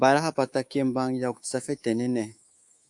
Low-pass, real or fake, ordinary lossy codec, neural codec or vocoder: 10.8 kHz; fake; AAC, 64 kbps; codec, 24 kHz, 3.1 kbps, DualCodec